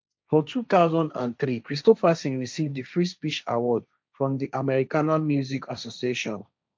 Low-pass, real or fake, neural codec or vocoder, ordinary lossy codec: none; fake; codec, 16 kHz, 1.1 kbps, Voila-Tokenizer; none